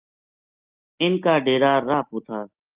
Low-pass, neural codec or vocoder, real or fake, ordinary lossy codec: 3.6 kHz; none; real; Opus, 32 kbps